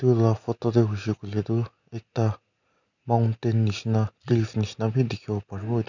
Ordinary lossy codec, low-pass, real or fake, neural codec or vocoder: none; 7.2 kHz; real; none